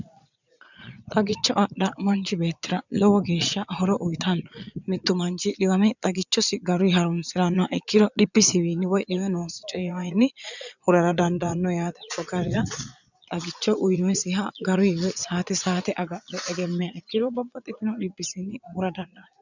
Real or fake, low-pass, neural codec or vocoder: fake; 7.2 kHz; vocoder, 22.05 kHz, 80 mel bands, Vocos